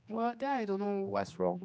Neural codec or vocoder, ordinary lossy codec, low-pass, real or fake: codec, 16 kHz, 2 kbps, X-Codec, HuBERT features, trained on general audio; none; none; fake